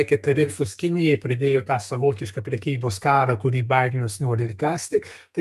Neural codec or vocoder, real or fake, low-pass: codec, 32 kHz, 1.9 kbps, SNAC; fake; 14.4 kHz